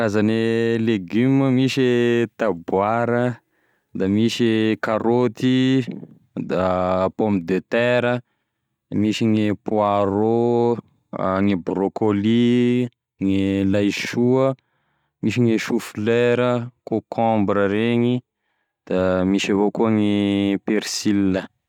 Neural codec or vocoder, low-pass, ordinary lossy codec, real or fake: none; 19.8 kHz; none; real